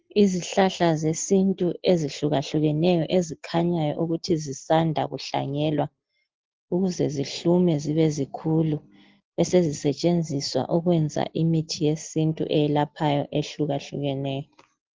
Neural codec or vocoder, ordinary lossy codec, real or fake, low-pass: none; Opus, 16 kbps; real; 7.2 kHz